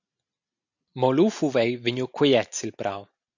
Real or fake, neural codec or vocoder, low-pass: real; none; 7.2 kHz